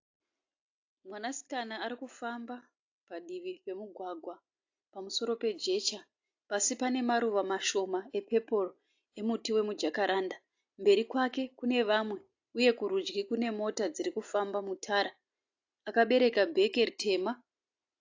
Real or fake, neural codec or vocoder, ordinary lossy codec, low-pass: real; none; AAC, 48 kbps; 7.2 kHz